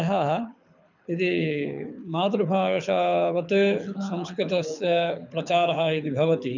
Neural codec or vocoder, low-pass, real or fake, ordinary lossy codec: codec, 24 kHz, 6 kbps, HILCodec; 7.2 kHz; fake; none